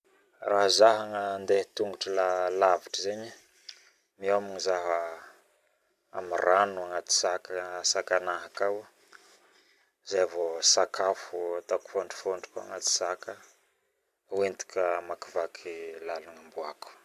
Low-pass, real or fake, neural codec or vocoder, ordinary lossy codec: 14.4 kHz; fake; vocoder, 44.1 kHz, 128 mel bands every 256 samples, BigVGAN v2; none